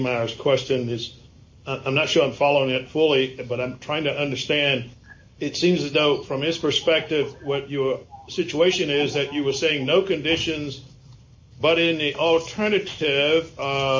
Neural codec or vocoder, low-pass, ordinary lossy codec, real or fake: none; 7.2 kHz; MP3, 32 kbps; real